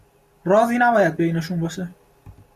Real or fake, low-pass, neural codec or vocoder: real; 14.4 kHz; none